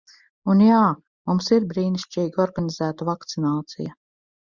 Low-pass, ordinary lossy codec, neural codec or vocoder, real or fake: 7.2 kHz; Opus, 64 kbps; none; real